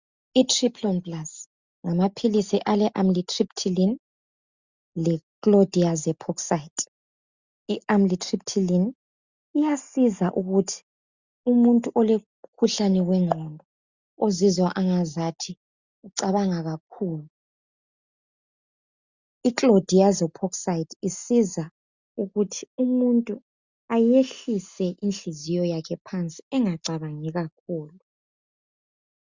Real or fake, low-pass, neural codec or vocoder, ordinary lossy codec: real; 7.2 kHz; none; Opus, 64 kbps